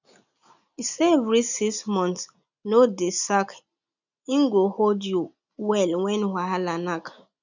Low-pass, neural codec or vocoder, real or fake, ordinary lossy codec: 7.2 kHz; none; real; none